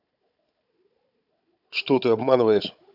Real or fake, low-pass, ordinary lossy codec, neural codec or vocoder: fake; 5.4 kHz; AAC, 48 kbps; codec, 16 kHz, 16 kbps, FunCodec, trained on Chinese and English, 50 frames a second